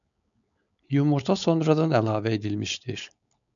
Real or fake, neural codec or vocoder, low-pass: fake; codec, 16 kHz, 4.8 kbps, FACodec; 7.2 kHz